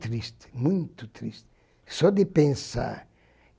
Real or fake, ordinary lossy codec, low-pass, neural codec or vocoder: real; none; none; none